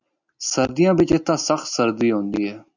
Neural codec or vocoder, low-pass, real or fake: none; 7.2 kHz; real